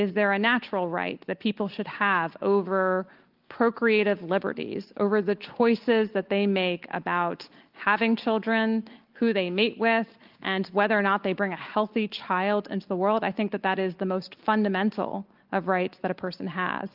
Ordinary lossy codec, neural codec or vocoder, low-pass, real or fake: Opus, 32 kbps; none; 5.4 kHz; real